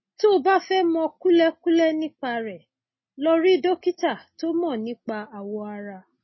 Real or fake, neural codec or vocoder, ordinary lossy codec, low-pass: real; none; MP3, 24 kbps; 7.2 kHz